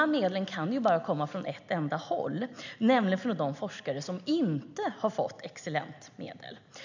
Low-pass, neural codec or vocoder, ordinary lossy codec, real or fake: 7.2 kHz; none; none; real